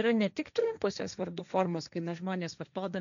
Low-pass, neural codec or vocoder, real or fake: 7.2 kHz; codec, 16 kHz, 1.1 kbps, Voila-Tokenizer; fake